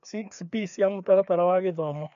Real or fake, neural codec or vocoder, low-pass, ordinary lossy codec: fake; codec, 16 kHz, 2 kbps, FreqCodec, larger model; 7.2 kHz; MP3, 64 kbps